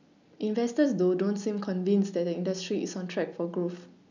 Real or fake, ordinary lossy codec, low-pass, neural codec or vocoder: real; none; 7.2 kHz; none